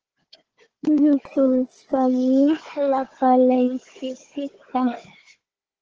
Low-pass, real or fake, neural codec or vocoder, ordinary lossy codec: 7.2 kHz; fake; codec, 16 kHz, 4 kbps, FunCodec, trained on Chinese and English, 50 frames a second; Opus, 16 kbps